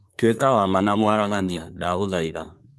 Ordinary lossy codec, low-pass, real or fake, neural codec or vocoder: none; none; fake; codec, 24 kHz, 1 kbps, SNAC